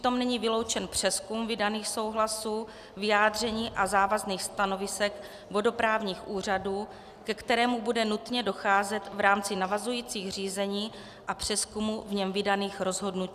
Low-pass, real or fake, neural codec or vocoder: 14.4 kHz; real; none